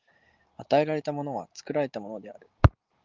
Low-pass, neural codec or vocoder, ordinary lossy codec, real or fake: 7.2 kHz; none; Opus, 32 kbps; real